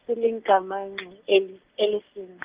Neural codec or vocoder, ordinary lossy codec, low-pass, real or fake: none; Opus, 32 kbps; 3.6 kHz; real